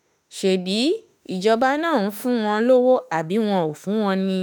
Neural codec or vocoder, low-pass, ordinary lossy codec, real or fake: autoencoder, 48 kHz, 32 numbers a frame, DAC-VAE, trained on Japanese speech; none; none; fake